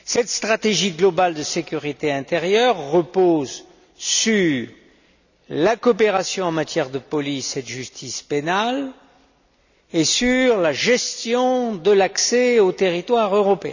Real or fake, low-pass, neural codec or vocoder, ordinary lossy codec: real; 7.2 kHz; none; none